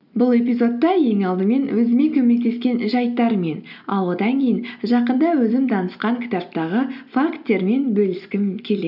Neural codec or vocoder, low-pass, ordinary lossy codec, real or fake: none; 5.4 kHz; none; real